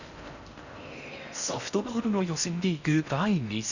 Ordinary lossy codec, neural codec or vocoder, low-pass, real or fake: none; codec, 16 kHz in and 24 kHz out, 0.6 kbps, FocalCodec, streaming, 4096 codes; 7.2 kHz; fake